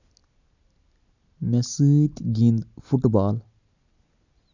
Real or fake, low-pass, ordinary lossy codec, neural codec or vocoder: real; 7.2 kHz; none; none